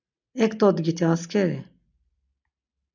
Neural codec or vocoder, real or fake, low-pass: none; real; 7.2 kHz